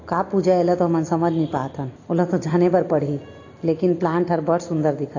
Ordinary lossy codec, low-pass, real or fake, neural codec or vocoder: AAC, 32 kbps; 7.2 kHz; real; none